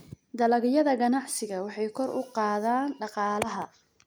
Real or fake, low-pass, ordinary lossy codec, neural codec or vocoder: real; none; none; none